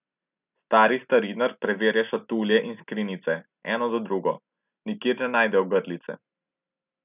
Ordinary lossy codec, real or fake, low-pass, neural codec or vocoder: none; real; 3.6 kHz; none